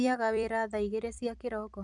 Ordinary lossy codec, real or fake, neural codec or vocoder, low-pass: none; fake; vocoder, 44.1 kHz, 128 mel bands every 256 samples, BigVGAN v2; 10.8 kHz